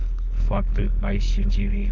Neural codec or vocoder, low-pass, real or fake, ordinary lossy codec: codec, 16 kHz, 4.8 kbps, FACodec; 7.2 kHz; fake; none